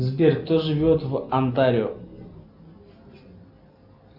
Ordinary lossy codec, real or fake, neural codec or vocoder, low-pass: Opus, 64 kbps; real; none; 5.4 kHz